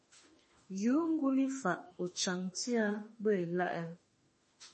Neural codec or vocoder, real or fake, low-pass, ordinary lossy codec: autoencoder, 48 kHz, 32 numbers a frame, DAC-VAE, trained on Japanese speech; fake; 10.8 kHz; MP3, 32 kbps